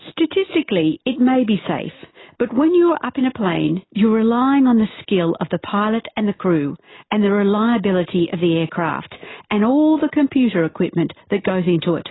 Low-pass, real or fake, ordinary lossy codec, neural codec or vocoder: 7.2 kHz; real; AAC, 16 kbps; none